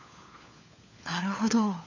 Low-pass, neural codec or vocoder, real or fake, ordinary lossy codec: 7.2 kHz; codec, 16 kHz, 4 kbps, FunCodec, trained on LibriTTS, 50 frames a second; fake; none